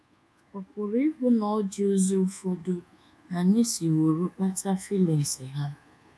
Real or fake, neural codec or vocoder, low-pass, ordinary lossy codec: fake; codec, 24 kHz, 1.2 kbps, DualCodec; none; none